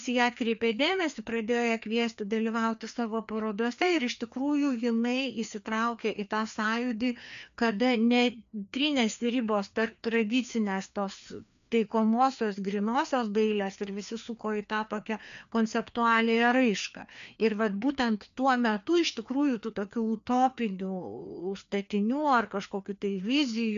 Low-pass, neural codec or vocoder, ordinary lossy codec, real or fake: 7.2 kHz; codec, 16 kHz, 2 kbps, FreqCodec, larger model; AAC, 96 kbps; fake